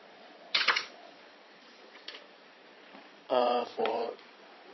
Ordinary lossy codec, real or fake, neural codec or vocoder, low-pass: MP3, 24 kbps; fake; vocoder, 22.05 kHz, 80 mel bands, Vocos; 7.2 kHz